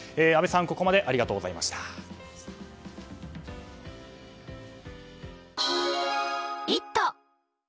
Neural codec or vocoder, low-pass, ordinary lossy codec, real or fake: none; none; none; real